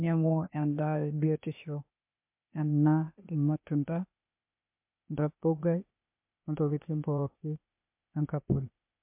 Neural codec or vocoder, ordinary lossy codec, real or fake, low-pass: codec, 16 kHz, 0.8 kbps, ZipCodec; MP3, 32 kbps; fake; 3.6 kHz